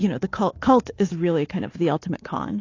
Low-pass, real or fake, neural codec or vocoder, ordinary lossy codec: 7.2 kHz; fake; codec, 24 kHz, 3.1 kbps, DualCodec; AAC, 32 kbps